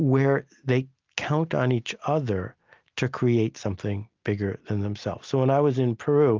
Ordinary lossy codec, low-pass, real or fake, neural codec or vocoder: Opus, 32 kbps; 7.2 kHz; real; none